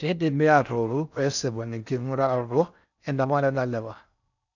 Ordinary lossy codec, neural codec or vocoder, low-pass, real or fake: none; codec, 16 kHz in and 24 kHz out, 0.6 kbps, FocalCodec, streaming, 4096 codes; 7.2 kHz; fake